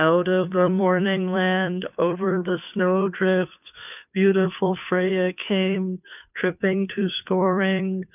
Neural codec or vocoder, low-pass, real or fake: codec, 16 kHz, 2 kbps, FunCodec, trained on Chinese and English, 25 frames a second; 3.6 kHz; fake